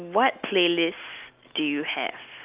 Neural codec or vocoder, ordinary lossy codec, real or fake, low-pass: none; Opus, 32 kbps; real; 3.6 kHz